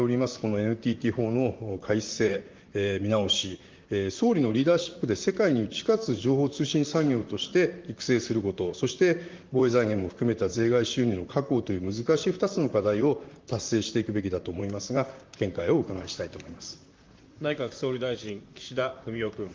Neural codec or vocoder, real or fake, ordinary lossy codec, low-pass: vocoder, 44.1 kHz, 128 mel bands, Pupu-Vocoder; fake; Opus, 24 kbps; 7.2 kHz